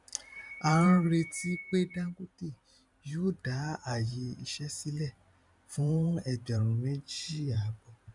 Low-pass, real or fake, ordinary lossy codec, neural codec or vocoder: 10.8 kHz; fake; none; vocoder, 44.1 kHz, 128 mel bands every 512 samples, BigVGAN v2